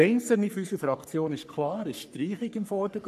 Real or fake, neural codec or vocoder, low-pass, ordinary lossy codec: fake; codec, 44.1 kHz, 3.4 kbps, Pupu-Codec; 14.4 kHz; none